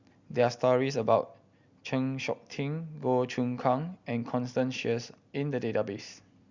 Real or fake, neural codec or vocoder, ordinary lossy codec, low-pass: real; none; Opus, 64 kbps; 7.2 kHz